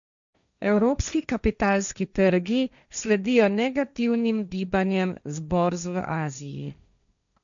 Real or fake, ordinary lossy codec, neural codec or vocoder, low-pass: fake; none; codec, 16 kHz, 1.1 kbps, Voila-Tokenizer; 7.2 kHz